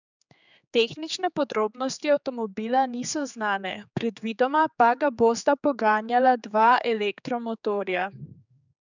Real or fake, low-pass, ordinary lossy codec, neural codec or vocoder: fake; 7.2 kHz; none; codec, 16 kHz, 4 kbps, X-Codec, HuBERT features, trained on general audio